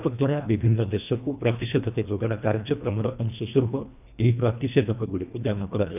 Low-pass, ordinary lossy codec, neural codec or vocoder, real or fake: 3.6 kHz; none; codec, 24 kHz, 1.5 kbps, HILCodec; fake